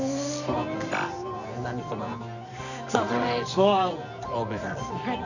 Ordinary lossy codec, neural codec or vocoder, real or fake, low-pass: none; codec, 24 kHz, 0.9 kbps, WavTokenizer, medium music audio release; fake; 7.2 kHz